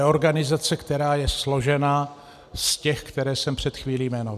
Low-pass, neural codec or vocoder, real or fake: 14.4 kHz; none; real